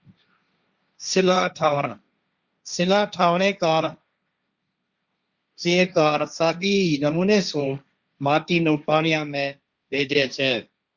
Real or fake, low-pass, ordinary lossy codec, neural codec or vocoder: fake; 7.2 kHz; Opus, 64 kbps; codec, 16 kHz, 1.1 kbps, Voila-Tokenizer